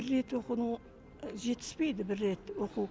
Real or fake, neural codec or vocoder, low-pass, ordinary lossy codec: real; none; none; none